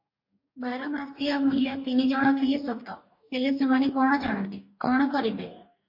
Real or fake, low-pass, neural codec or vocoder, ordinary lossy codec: fake; 5.4 kHz; codec, 44.1 kHz, 2.6 kbps, DAC; MP3, 32 kbps